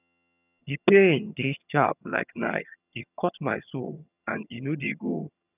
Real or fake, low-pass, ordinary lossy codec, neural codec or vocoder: fake; 3.6 kHz; none; vocoder, 22.05 kHz, 80 mel bands, HiFi-GAN